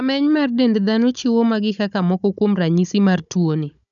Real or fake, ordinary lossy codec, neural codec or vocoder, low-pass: fake; none; codec, 16 kHz, 16 kbps, FunCodec, trained on Chinese and English, 50 frames a second; 7.2 kHz